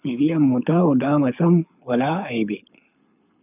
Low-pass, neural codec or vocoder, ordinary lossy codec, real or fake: 3.6 kHz; codec, 24 kHz, 6 kbps, HILCodec; none; fake